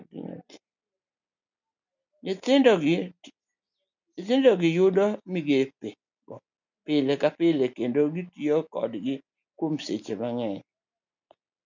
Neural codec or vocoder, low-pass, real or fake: none; 7.2 kHz; real